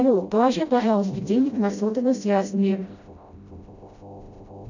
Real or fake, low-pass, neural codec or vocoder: fake; 7.2 kHz; codec, 16 kHz, 0.5 kbps, FreqCodec, smaller model